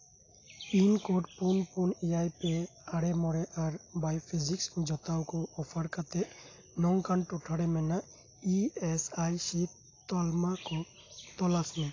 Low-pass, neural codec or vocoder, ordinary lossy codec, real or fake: 7.2 kHz; none; AAC, 32 kbps; real